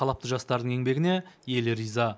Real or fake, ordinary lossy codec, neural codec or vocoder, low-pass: real; none; none; none